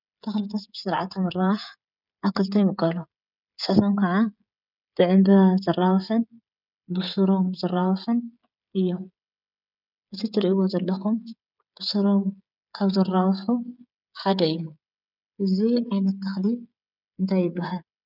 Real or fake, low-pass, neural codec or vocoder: fake; 5.4 kHz; codec, 16 kHz, 16 kbps, FreqCodec, smaller model